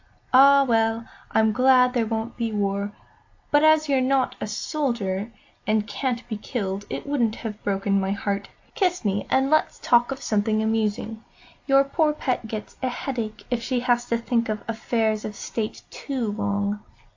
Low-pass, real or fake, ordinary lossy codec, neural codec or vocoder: 7.2 kHz; real; AAC, 48 kbps; none